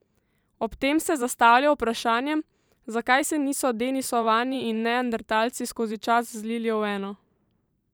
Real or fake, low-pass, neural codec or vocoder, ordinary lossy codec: fake; none; vocoder, 44.1 kHz, 128 mel bands every 512 samples, BigVGAN v2; none